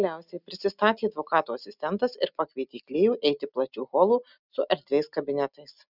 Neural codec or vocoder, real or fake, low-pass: none; real; 5.4 kHz